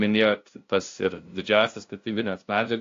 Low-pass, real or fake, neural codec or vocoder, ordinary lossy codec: 7.2 kHz; fake; codec, 16 kHz, 0.5 kbps, FunCodec, trained on LibriTTS, 25 frames a second; AAC, 48 kbps